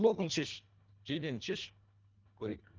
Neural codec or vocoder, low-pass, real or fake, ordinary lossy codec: codec, 24 kHz, 1.5 kbps, HILCodec; 7.2 kHz; fake; Opus, 32 kbps